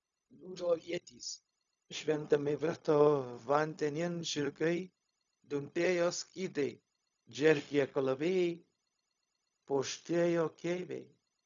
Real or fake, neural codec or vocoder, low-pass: fake; codec, 16 kHz, 0.4 kbps, LongCat-Audio-Codec; 7.2 kHz